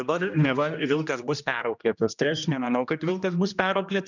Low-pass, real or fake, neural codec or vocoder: 7.2 kHz; fake; codec, 16 kHz, 1 kbps, X-Codec, HuBERT features, trained on general audio